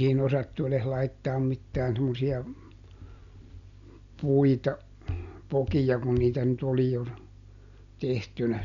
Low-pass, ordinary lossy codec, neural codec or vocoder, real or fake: 7.2 kHz; none; none; real